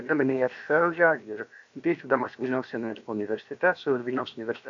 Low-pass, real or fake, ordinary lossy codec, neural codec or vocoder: 7.2 kHz; fake; AAC, 64 kbps; codec, 16 kHz, about 1 kbps, DyCAST, with the encoder's durations